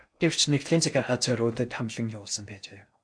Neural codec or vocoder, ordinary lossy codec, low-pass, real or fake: codec, 16 kHz in and 24 kHz out, 0.6 kbps, FocalCodec, streaming, 4096 codes; AAC, 64 kbps; 9.9 kHz; fake